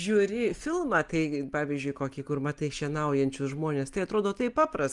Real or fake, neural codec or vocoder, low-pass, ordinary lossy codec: real; none; 10.8 kHz; Opus, 32 kbps